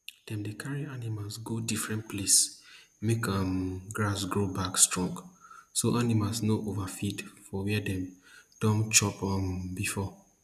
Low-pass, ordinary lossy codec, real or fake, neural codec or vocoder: 14.4 kHz; none; real; none